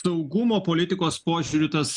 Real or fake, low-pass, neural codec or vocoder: real; 10.8 kHz; none